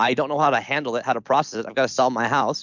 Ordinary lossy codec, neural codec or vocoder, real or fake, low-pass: MP3, 64 kbps; none; real; 7.2 kHz